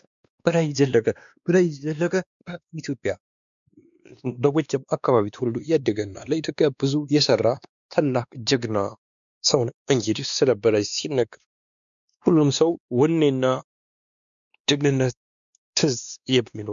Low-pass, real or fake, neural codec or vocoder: 7.2 kHz; fake; codec, 16 kHz, 2 kbps, X-Codec, WavLM features, trained on Multilingual LibriSpeech